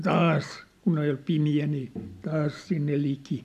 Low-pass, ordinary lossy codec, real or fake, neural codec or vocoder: 14.4 kHz; AAC, 96 kbps; real; none